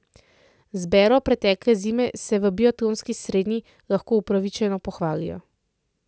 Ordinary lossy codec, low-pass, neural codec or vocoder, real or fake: none; none; none; real